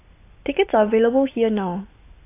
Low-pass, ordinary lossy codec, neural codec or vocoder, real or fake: 3.6 kHz; AAC, 24 kbps; none; real